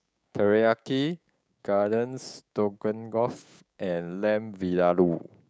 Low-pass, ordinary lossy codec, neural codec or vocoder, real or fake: none; none; codec, 16 kHz, 6 kbps, DAC; fake